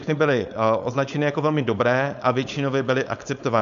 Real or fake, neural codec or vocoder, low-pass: fake; codec, 16 kHz, 4.8 kbps, FACodec; 7.2 kHz